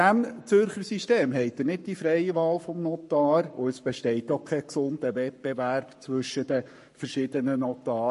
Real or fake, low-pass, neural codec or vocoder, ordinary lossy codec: fake; 14.4 kHz; codec, 44.1 kHz, 7.8 kbps, Pupu-Codec; MP3, 48 kbps